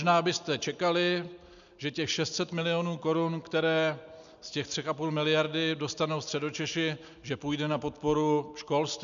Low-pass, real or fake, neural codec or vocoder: 7.2 kHz; real; none